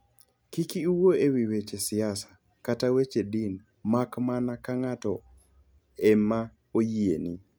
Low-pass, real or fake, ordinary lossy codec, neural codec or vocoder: none; real; none; none